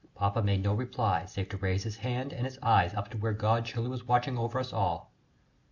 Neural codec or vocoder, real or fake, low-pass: none; real; 7.2 kHz